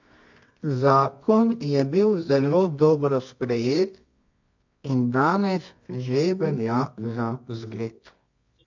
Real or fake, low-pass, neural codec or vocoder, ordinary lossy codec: fake; 7.2 kHz; codec, 24 kHz, 0.9 kbps, WavTokenizer, medium music audio release; MP3, 48 kbps